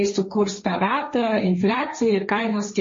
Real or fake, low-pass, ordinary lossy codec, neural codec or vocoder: fake; 7.2 kHz; MP3, 32 kbps; codec, 16 kHz, 1.1 kbps, Voila-Tokenizer